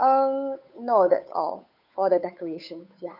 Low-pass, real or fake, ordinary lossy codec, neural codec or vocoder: 5.4 kHz; fake; none; codec, 16 kHz, 8 kbps, FunCodec, trained on Chinese and English, 25 frames a second